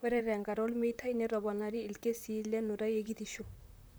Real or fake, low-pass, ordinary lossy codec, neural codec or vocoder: fake; none; none; vocoder, 44.1 kHz, 128 mel bands, Pupu-Vocoder